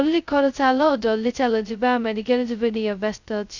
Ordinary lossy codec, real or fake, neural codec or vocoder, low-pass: none; fake; codec, 16 kHz, 0.2 kbps, FocalCodec; 7.2 kHz